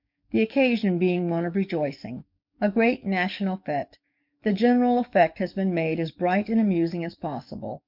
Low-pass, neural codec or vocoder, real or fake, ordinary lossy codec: 5.4 kHz; codec, 44.1 kHz, 7.8 kbps, DAC; fake; MP3, 48 kbps